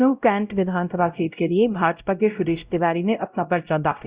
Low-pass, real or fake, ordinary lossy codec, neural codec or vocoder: 3.6 kHz; fake; Opus, 64 kbps; codec, 16 kHz, 0.5 kbps, X-Codec, WavLM features, trained on Multilingual LibriSpeech